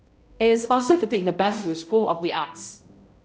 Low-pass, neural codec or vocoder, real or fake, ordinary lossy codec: none; codec, 16 kHz, 0.5 kbps, X-Codec, HuBERT features, trained on balanced general audio; fake; none